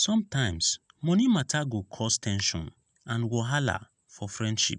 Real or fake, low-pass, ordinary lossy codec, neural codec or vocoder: real; 10.8 kHz; none; none